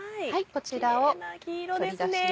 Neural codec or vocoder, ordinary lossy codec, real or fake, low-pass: none; none; real; none